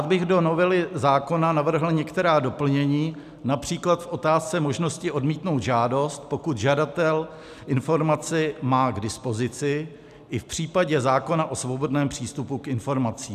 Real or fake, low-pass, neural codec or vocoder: real; 14.4 kHz; none